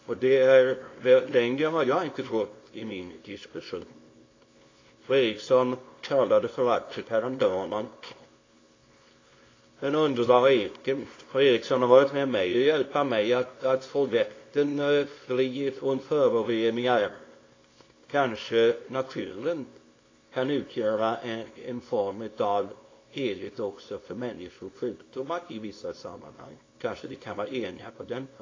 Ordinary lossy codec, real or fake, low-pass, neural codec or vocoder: AAC, 32 kbps; fake; 7.2 kHz; codec, 24 kHz, 0.9 kbps, WavTokenizer, small release